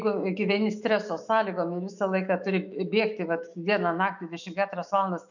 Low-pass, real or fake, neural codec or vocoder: 7.2 kHz; fake; autoencoder, 48 kHz, 128 numbers a frame, DAC-VAE, trained on Japanese speech